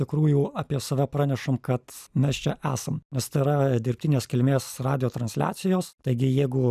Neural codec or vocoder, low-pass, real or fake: none; 14.4 kHz; real